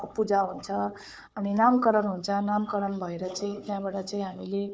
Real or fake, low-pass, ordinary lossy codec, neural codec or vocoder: fake; none; none; codec, 16 kHz, 4 kbps, FunCodec, trained on Chinese and English, 50 frames a second